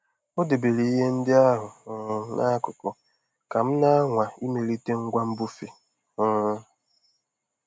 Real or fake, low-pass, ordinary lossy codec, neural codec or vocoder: real; none; none; none